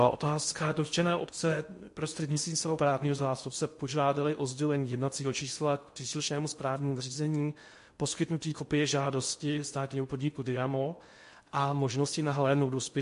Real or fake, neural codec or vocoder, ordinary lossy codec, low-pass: fake; codec, 16 kHz in and 24 kHz out, 0.6 kbps, FocalCodec, streaming, 2048 codes; MP3, 48 kbps; 10.8 kHz